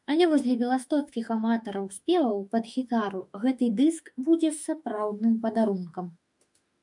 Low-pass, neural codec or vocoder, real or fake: 10.8 kHz; autoencoder, 48 kHz, 32 numbers a frame, DAC-VAE, trained on Japanese speech; fake